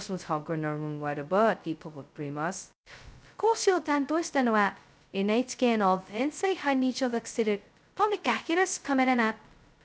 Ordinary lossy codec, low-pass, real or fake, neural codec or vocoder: none; none; fake; codec, 16 kHz, 0.2 kbps, FocalCodec